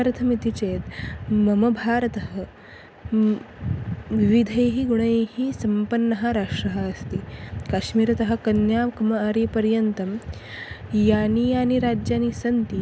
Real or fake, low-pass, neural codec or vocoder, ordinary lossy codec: real; none; none; none